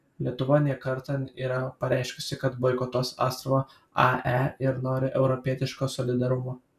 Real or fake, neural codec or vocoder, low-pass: real; none; 14.4 kHz